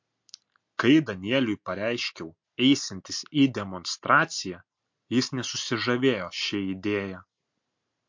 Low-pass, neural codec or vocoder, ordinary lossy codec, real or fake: 7.2 kHz; none; MP3, 48 kbps; real